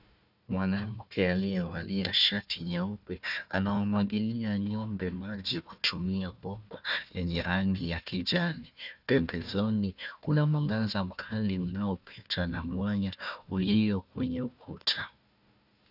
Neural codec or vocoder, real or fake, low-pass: codec, 16 kHz, 1 kbps, FunCodec, trained on Chinese and English, 50 frames a second; fake; 5.4 kHz